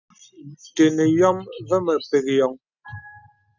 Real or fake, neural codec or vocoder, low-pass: real; none; 7.2 kHz